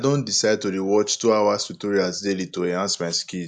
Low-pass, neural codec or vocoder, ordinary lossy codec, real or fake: 10.8 kHz; none; none; real